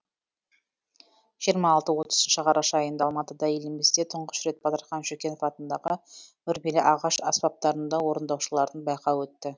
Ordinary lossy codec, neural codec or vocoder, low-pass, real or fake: none; none; none; real